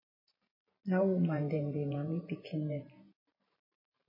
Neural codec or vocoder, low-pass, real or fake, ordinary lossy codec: vocoder, 44.1 kHz, 128 mel bands every 512 samples, BigVGAN v2; 5.4 kHz; fake; MP3, 24 kbps